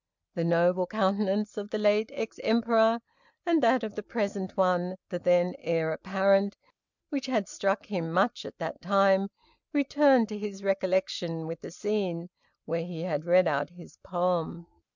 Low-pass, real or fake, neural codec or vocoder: 7.2 kHz; real; none